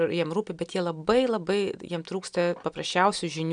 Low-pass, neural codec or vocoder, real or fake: 9.9 kHz; none; real